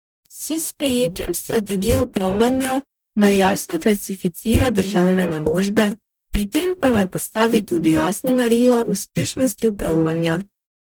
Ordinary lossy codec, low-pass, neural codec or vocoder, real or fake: none; none; codec, 44.1 kHz, 0.9 kbps, DAC; fake